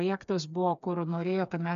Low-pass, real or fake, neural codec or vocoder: 7.2 kHz; fake; codec, 16 kHz, 4 kbps, FreqCodec, smaller model